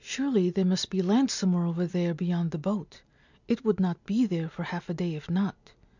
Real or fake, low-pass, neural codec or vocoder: real; 7.2 kHz; none